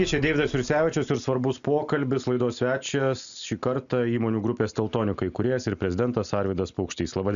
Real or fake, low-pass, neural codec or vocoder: real; 7.2 kHz; none